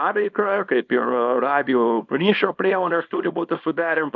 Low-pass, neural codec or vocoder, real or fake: 7.2 kHz; codec, 24 kHz, 0.9 kbps, WavTokenizer, small release; fake